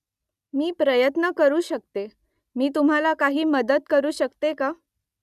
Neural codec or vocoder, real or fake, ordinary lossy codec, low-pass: none; real; none; 14.4 kHz